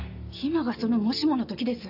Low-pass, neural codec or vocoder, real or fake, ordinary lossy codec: 5.4 kHz; none; real; AAC, 48 kbps